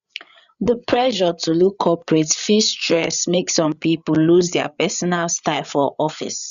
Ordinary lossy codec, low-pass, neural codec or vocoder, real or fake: none; 7.2 kHz; codec, 16 kHz, 8 kbps, FreqCodec, larger model; fake